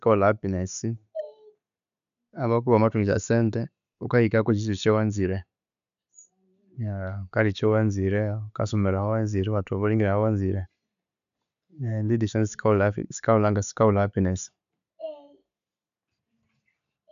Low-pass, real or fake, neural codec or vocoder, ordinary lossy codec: 7.2 kHz; real; none; none